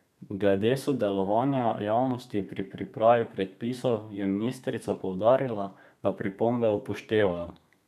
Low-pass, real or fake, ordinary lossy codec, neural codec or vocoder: 14.4 kHz; fake; none; codec, 32 kHz, 1.9 kbps, SNAC